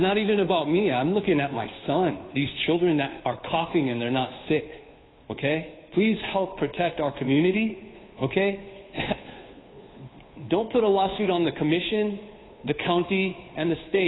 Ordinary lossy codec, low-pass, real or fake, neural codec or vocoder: AAC, 16 kbps; 7.2 kHz; fake; codec, 16 kHz, 2 kbps, FunCodec, trained on Chinese and English, 25 frames a second